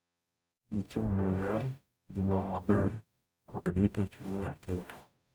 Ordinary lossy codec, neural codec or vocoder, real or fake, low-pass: none; codec, 44.1 kHz, 0.9 kbps, DAC; fake; none